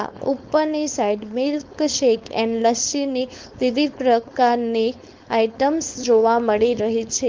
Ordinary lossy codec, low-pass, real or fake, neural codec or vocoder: Opus, 24 kbps; 7.2 kHz; fake; codec, 16 kHz, 4.8 kbps, FACodec